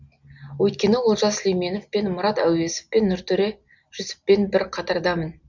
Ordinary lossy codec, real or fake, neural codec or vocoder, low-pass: none; real; none; 7.2 kHz